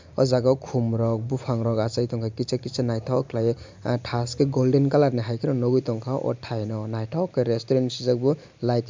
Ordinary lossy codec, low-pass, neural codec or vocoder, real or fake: MP3, 64 kbps; 7.2 kHz; none; real